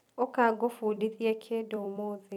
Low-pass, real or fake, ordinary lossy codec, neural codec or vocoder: 19.8 kHz; fake; none; vocoder, 44.1 kHz, 128 mel bands every 256 samples, BigVGAN v2